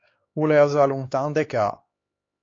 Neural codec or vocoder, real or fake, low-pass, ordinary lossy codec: codec, 16 kHz, 4 kbps, X-Codec, HuBERT features, trained on LibriSpeech; fake; 7.2 kHz; AAC, 32 kbps